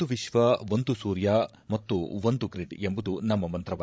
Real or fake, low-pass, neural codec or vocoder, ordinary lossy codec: fake; none; codec, 16 kHz, 16 kbps, FreqCodec, larger model; none